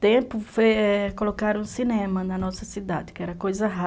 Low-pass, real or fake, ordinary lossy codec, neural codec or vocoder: none; real; none; none